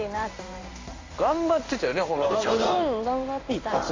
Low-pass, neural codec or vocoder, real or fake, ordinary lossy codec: 7.2 kHz; codec, 16 kHz in and 24 kHz out, 1 kbps, XY-Tokenizer; fake; AAC, 32 kbps